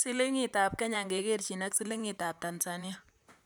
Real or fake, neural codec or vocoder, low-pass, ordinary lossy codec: fake; vocoder, 44.1 kHz, 128 mel bands, Pupu-Vocoder; none; none